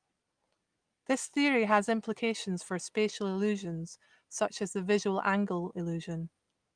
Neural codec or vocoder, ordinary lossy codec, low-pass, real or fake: none; Opus, 32 kbps; 9.9 kHz; real